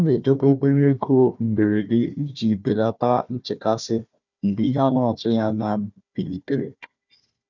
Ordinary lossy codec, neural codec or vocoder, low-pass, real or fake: none; codec, 16 kHz, 1 kbps, FunCodec, trained on Chinese and English, 50 frames a second; 7.2 kHz; fake